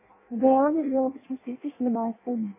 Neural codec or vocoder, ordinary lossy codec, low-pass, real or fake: codec, 16 kHz in and 24 kHz out, 0.6 kbps, FireRedTTS-2 codec; MP3, 16 kbps; 3.6 kHz; fake